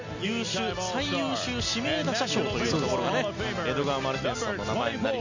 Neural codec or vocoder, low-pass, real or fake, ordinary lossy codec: none; 7.2 kHz; real; Opus, 64 kbps